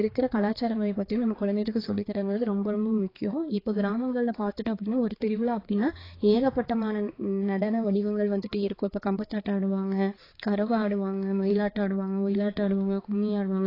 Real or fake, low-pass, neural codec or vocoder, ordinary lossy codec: fake; 5.4 kHz; codec, 16 kHz, 4 kbps, X-Codec, HuBERT features, trained on balanced general audio; AAC, 24 kbps